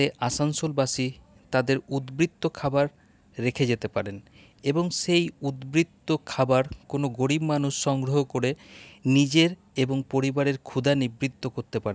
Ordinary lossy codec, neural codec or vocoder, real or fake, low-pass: none; none; real; none